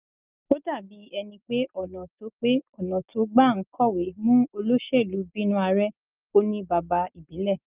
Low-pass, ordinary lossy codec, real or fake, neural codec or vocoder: 3.6 kHz; Opus, 24 kbps; real; none